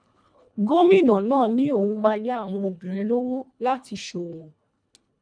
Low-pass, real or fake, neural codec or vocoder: 9.9 kHz; fake; codec, 24 kHz, 1.5 kbps, HILCodec